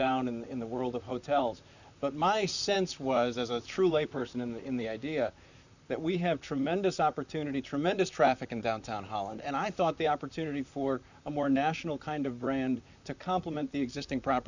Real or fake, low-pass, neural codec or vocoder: fake; 7.2 kHz; vocoder, 44.1 kHz, 128 mel bands, Pupu-Vocoder